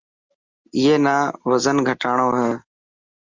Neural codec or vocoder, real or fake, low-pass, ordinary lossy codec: none; real; 7.2 kHz; Opus, 32 kbps